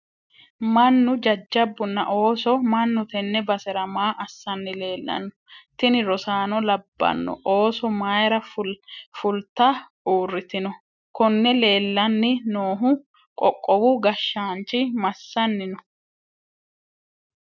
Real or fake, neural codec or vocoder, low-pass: real; none; 7.2 kHz